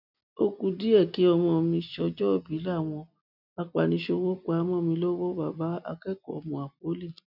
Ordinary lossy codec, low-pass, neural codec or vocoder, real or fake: none; 5.4 kHz; none; real